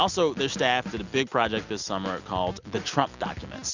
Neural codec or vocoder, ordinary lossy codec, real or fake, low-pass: none; Opus, 64 kbps; real; 7.2 kHz